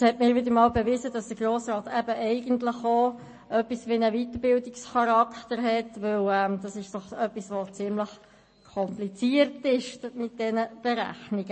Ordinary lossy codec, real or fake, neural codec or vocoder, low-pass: MP3, 32 kbps; real; none; 9.9 kHz